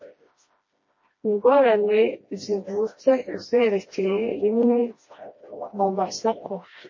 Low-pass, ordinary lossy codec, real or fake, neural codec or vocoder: 7.2 kHz; MP3, 32 kbps; fake; codec, 16 kHz, 1 kbps, FreqCodec, smaller model